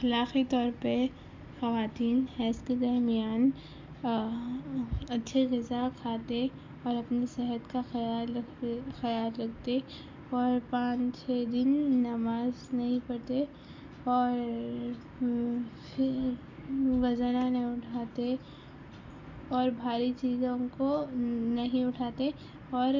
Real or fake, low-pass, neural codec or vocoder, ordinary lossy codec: real; 7.2 kHz; none; MP3, 64 kbps